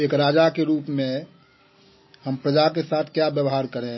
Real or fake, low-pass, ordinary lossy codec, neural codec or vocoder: real; 7.2 kHz; MP3, 24 kbps; none